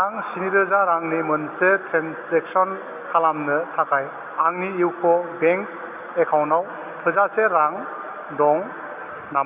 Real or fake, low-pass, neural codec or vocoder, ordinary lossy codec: real; 3.6 kHz; none; Opus, 64 kbps